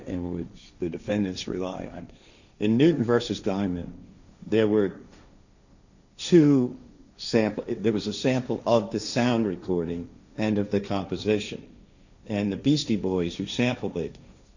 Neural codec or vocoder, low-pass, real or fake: codec, 16 kHz, 1.1 kbps, Voila-Tokenizer; 7.2 kHz; fake